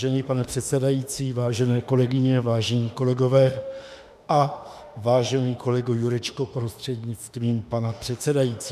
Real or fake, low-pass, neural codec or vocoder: fake; 14.4 kHz; autoencoder, 48 kHz, 32 numbers a frame, DAC-VAE, trained on Japanese speech